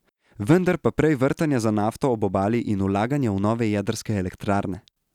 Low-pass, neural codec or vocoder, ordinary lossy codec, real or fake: 19.8 kHz; none; none; real